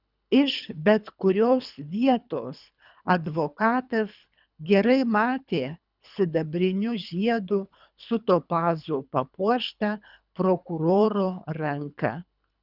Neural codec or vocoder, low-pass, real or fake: codec, 24 kHz, 3 kbps, HILCodec; 5.4 kHz; fake